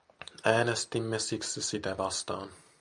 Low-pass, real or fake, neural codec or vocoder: 10.8 kHz; real; none